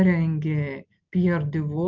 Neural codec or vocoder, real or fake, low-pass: none; real; 7.2 kHz